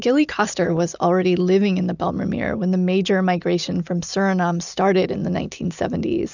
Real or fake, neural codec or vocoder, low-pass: real; none; 7.2 kHz